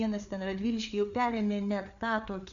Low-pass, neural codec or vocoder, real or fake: 7.2 kHz; codec, 16 kHz, 4 kbps, FreqCodec, larger model; fake